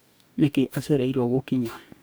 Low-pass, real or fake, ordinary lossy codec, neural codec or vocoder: none; fake; none; codec, 44.1 kHz, 2.6 kbps, DAC